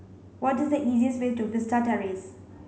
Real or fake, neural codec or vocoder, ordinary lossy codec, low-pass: real; none; none; none